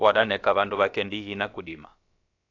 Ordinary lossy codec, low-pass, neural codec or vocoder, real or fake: AAC, 48 kbps; 7.2 kHz; codec, 16 kHz, about 1 kbps, DyCAST, with the encoder's durations; fake